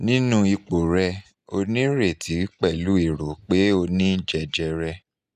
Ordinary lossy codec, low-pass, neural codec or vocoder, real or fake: none; 14.4 kHz; none; real